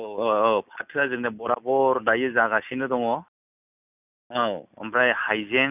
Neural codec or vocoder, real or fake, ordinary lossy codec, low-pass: none; real; none; 3.6 kHz